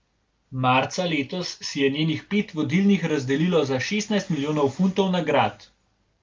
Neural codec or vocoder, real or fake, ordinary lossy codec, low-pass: none; real; Opus, 32 kbps; 7.2 kHz